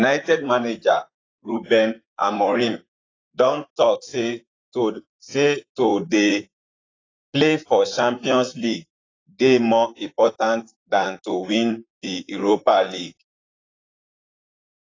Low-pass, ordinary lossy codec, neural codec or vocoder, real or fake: 7.2 kHz; AAC, 32 kbps; vocoder, 44.1 kHz, 128 mel bands, Pupu-Vocoder; fake